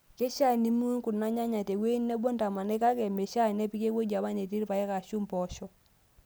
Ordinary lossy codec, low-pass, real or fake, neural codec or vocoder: none; none; real; none